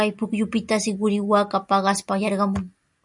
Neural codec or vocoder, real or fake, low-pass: none; real; 10.8 kHz